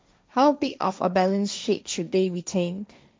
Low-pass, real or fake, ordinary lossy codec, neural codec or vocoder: none; fake; none; codec, 16 kHz, 1.1 kbps, Voila-Tokenizer